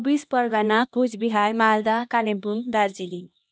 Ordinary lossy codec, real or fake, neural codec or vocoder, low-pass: none; fake; codec, 16 kHz, 1 kbps, X-Codec, HuBERT features, trained on LibriSpeech; none